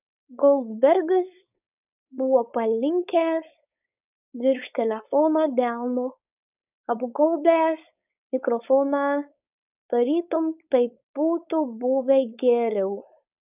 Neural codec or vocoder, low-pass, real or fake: codec, 16 kHz, 4.8 kbps, FACodec; 3.6 kHz; fake